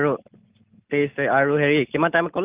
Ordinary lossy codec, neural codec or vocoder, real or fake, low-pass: Opus, 24 kbps; none; real; 3.6 kHz